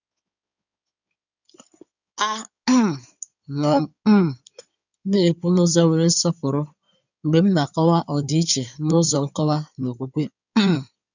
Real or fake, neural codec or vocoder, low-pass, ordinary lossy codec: fake; codec, 16 kHz in and 24 kHz out, 2.2 kbps, FireRedTTS-2 codec; 7.2 kHz; none